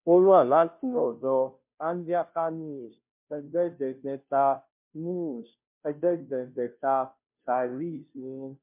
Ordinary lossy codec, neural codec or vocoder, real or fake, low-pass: MP3, 32 kbps; codec, 16 kHz, 0.5 kbps, FunCodec, trained on Chinese and English, 25 frames a second; fake; 3.6 kHz